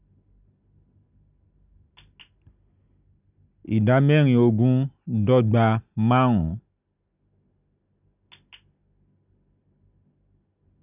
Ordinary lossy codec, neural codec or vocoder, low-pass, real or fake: none; none; 3.6 kHz; real